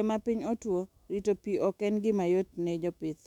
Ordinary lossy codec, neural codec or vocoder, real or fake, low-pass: none; autoencoder, 48 kHz, 128 numbers a frame, DAC-VAE, trained on Japanese speech; fake; 19.8 kHz